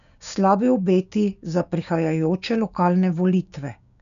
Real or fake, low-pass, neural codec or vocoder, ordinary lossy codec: fake; 7.2 kHz; codec, 16 kHz, 6 kbps, DAC; none